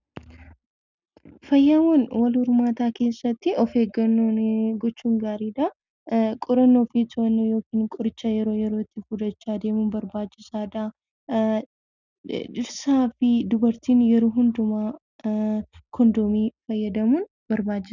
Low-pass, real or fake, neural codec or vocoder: 7.2 kHz; real; none